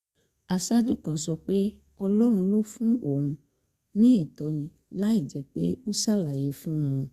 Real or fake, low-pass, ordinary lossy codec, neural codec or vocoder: fake; 14.4 kHz; Opus, 64 kbps; codec, 32 kHz, 1.9 kbps, SNAC